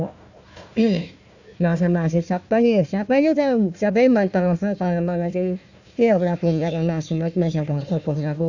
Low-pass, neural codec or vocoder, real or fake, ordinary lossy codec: 7.2 kHz; codec, 16 kHz, 1 kbps, FunCodec, trained on Chinese and English, 50 frames a second; fake; none